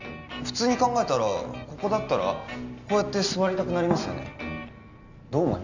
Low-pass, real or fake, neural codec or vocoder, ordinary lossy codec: 7.2 kHz; real; none; Opus, 64 kbps